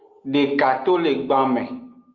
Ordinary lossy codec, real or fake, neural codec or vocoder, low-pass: Opus, 32 kbps; real; none; 7.2 kHz